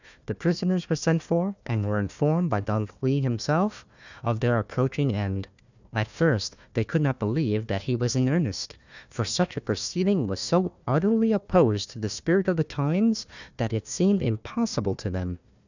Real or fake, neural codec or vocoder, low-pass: fake; codec, 16 kHz, 1 kbps, FunCodec, trained on Chinese and English, 50 frames a second; 7.2 kHz